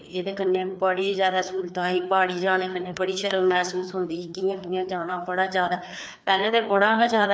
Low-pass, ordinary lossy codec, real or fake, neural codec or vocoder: none; none; fake; codec, 16 kHz, 2 kbps, FreqCodec, larger model